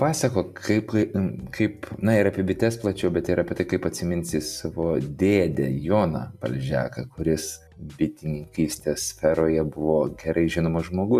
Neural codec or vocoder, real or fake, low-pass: none; real; 14.4 kHz